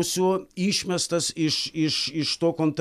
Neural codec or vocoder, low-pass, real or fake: none; 14.4 kHz; real